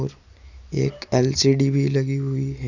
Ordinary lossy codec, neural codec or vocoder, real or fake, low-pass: none; none; real; 7.2 kHz